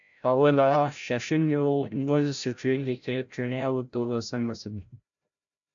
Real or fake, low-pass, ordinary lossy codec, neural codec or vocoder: fake; 7.2 kHz; MP3, 64 kbps; codec, 16 kHz, 0.5 kbps, FreqCodec, larger model